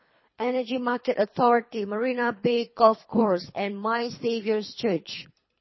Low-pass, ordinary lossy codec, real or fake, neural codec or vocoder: 7.2 kHz; MP3, 24 kbps; fake; codec, 24 kHz, 3 kbps, HILCodec